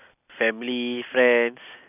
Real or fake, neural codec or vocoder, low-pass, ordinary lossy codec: real; none; 3.6 kHz; none